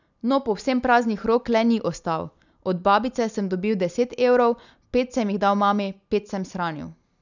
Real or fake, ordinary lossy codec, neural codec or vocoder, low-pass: real; none; none; 7.2 kHz